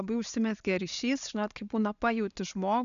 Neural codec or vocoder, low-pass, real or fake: none; 7.2 kHz; real